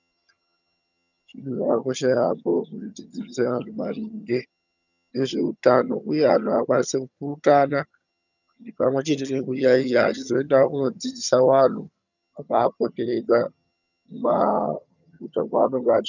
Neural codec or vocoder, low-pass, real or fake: vocoder, 22.05 kHz, 80 mel bands, HiFi-GAN; 7.2 kHz; fake